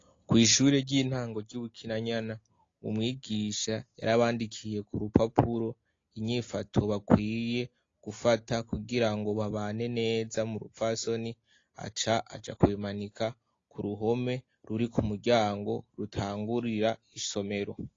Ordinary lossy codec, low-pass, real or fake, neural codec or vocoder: AAC, 32 kbps; 7.2 kHz; real; none